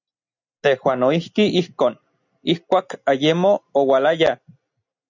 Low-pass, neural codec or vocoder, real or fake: 7.2 kHz; none; real